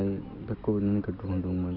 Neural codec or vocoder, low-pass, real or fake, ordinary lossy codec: none; 5.4 kHz; real; none